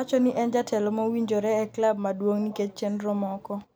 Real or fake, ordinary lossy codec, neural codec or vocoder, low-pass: real; none; none; none